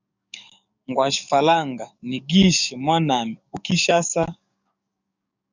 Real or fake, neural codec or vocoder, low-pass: fake; codec, 44.1 kHz, 7.8 kbps, DAC; 7.2 kHz